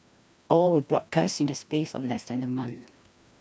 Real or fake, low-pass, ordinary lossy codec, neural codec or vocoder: fake; none; none; codec, 16 kHz, 1 kbps, FreqCodec, larger model